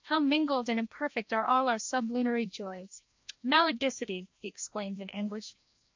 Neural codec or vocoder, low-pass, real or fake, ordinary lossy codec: codec, 16 kHz, 1 kbps, FreqCodec, larger model; 7.2 kHz; fake; MP3, 48 kbps